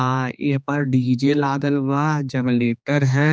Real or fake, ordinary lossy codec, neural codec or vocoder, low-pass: fake; none; codec, 16 kHz, 2 kbps, X-Codec, HuBERT features, trained on general audio; none